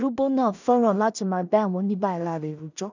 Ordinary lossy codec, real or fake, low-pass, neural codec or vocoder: none; fake; 7.2 kHz; codec, 16 kHz in and 24 kHz out, 0.4 kbps, LongCat-Audio-Codec, two codebook decoder